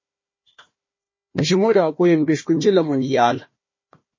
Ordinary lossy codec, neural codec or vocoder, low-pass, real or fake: MP3, 32 kbps; codec, 16 kHz, 1 kbps, FunCodec, trained on Chinese and English, 50 frames a second; 7.2 kHz; fake